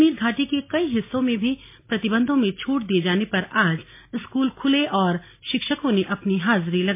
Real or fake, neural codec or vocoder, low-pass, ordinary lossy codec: real; none; 3.6 kHz; MP3, 24 kbps